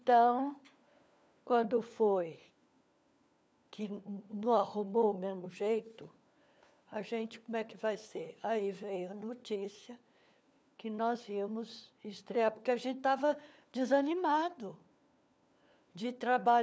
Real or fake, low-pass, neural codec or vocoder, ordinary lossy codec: fake; none; codec, 16 kHz, 4 kbps, FunCodec, trained on LibriTTS, 50 frames a second; none